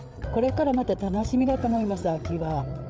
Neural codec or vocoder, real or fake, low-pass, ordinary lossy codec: codec, 16 kHz, 8 kbps, FreqCodec, larger model; fake; none; none